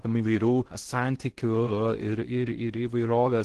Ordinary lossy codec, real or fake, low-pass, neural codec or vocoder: Opus, 16 kbps; fake; 10.8 kHz; codec, 16 kHz in and 24 kHz out, 0.6 kbps, FocalCodec, streaming, 2048 codes